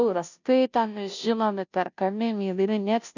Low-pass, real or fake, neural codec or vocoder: 7.2 kHz; fake; codec, 16 kHz, 0.5 kbps, FunCodec, trained on Chinese and English, 25 frames a second